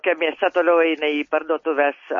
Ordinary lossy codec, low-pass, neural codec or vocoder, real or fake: MP3, 32 kbps; 7.2 kHz; none; real